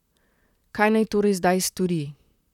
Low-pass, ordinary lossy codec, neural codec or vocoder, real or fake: 19.8 kHz; none; vocoder, 44.1 kHz, 128 mel bands, Pupu-Vocoder; fake